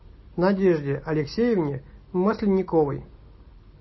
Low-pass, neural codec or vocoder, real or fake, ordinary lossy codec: 7.2 kHz; none; real; MP3, 24 kbps